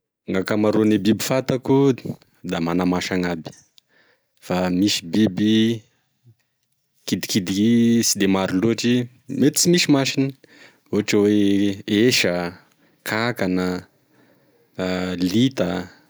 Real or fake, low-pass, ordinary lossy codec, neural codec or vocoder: real; none; none; none